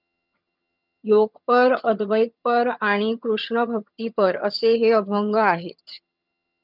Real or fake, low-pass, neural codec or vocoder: fake; 5.4 kHz; vocoder, 22.05 kHz, 80 mel bands, HiFi-GAN